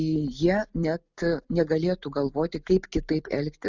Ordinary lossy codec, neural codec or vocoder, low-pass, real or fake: Opus, 64 kbps; none; 7.2 kHz; real